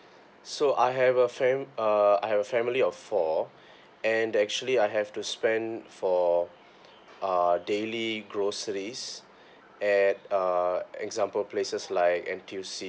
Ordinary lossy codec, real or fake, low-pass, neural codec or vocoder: none; real; none; none